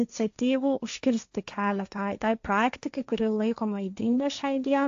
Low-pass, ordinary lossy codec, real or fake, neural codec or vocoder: 7.2 kHz; AAC, 64 kbps; fake; codec, 16 kHz, 1.1 kbps, Voila-Tokenizer